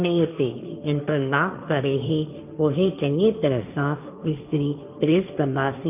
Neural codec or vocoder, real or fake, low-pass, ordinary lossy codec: codec, 16 kHz, 1.1 kbps, Voila-Tokenizer; fake; 3.6 kHz; none